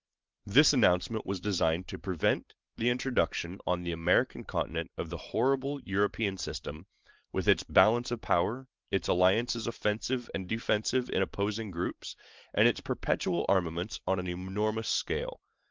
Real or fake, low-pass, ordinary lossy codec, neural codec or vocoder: fake; 7.2 kHz; Opus, 24 kbps; vocoder, 44.1 kHz, 128 mel bands every 512 samples, BigVGAN v2